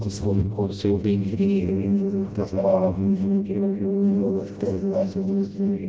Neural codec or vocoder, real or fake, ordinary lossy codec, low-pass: codec, 16 kHz, 0.5 kbps, FreqCodec, smaller model; fake; none; none